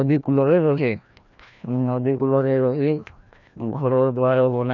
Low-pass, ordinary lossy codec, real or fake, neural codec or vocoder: 7.2 kHz; none; fake; codec, 16 kHz, 1 kbps, FreqCodec, larger model